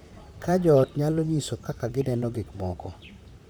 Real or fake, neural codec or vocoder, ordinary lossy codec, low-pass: fake; vocoder, 44.1 kHz, 128 mel bands, Pupu-Vocoder; none; none